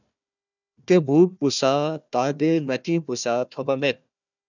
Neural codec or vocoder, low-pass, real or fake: codec, 16 kHz, 1 kbps, FunCodec, trained on Chinese and English, 50 frames a second; 7.2 kHz; fake